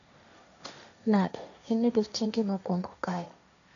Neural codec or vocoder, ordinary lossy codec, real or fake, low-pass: codec, 16 kHz, 1.1 kbps, Voila-Tokenizer; none; fake; 7.2 kHz